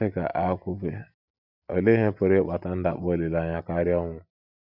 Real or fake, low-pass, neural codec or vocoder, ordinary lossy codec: real; 5.4 kHz; none; none